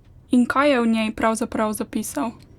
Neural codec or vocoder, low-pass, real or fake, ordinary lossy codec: none; 19.8 kHz; real; Opus, 64 kbps